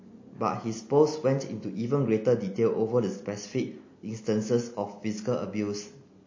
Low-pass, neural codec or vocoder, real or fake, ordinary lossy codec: 7.2 kHz; none; real; MP3, 32 kbps